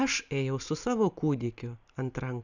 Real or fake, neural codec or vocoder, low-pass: real; none; 7.2 kHz